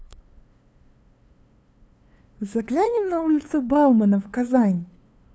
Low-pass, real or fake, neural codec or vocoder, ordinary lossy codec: none; fake; codec, 16 kHz, 2 kbps, FunCodec, trained on LibriTTS, 25 frames a second; none